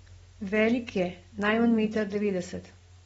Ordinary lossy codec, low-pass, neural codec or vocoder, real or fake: AAC, 24 kbps; 19.8 kHz; none; real